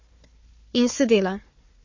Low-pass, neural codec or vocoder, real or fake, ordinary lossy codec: 7.2 kHz; codec, 16 kHz, 4 kbps, FunCodec, trained on Chinese and English, 50 frames a second; fake; MP3, 32 kbps